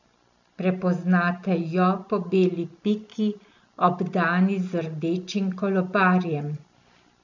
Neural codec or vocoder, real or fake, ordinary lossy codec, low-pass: none; real; none; 7.2 kHz